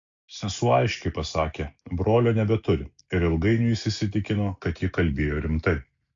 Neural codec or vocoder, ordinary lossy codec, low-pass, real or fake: none; AAC, 48 kbps; 7.2 kHz; real